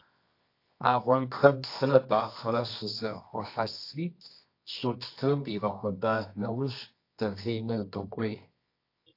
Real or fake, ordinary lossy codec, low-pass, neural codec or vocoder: fake; AAC, 32 kbps; 5.4 kHz; codec, 24 kHz, 0.9 kbps, WavTokenizer, medium music audio release